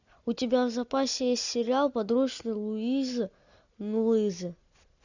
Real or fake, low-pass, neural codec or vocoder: real; 7.2 kHz; none